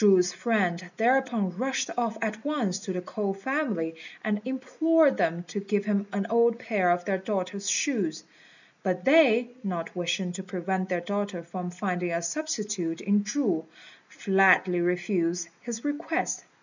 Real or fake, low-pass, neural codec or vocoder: real; 7.2 kHz; none